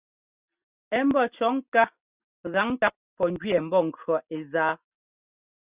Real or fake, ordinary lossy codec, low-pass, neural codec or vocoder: real; Opus, 64 kbps; 3.6 kHz; none